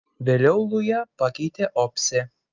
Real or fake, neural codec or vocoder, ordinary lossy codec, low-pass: real; none; Opus, 32 kbps; 7.2 kHz